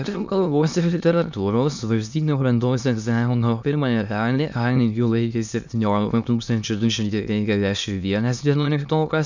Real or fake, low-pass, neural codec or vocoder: fake; 7.2 kHz; autoencoder, 22.05 kHz, a latent of 192 numbers a frame, VITS, trained on many speakers